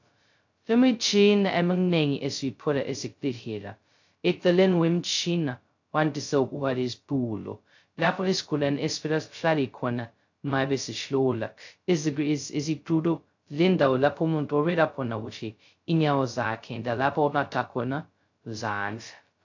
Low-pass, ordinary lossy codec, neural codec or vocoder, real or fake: 7.2 kHz; AAC, 48 kbps; codec, 16 kHz, 0.2 kbps, FocalCodec; fake